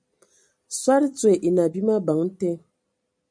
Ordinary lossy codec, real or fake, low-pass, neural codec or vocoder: MP3, 64 kbps; real; 9.9 kHz; none